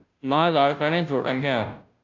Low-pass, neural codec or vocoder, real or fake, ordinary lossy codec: 7.2 kHz; codec, 16 kHz, 0.5 kbps, FunCodec, trained on Chinese and English, 25 frames a second; fake; MP3, 64 kbps